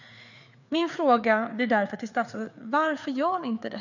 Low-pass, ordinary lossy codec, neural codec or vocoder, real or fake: 7.2 kHz; none; codec, 16 kHz, 4 kbps, FreqCodec, larger model; fake